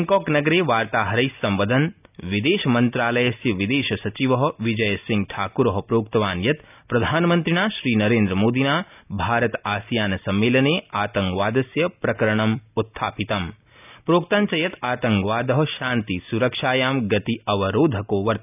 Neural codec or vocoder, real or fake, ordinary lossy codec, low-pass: none; real; none; 3.6 kHz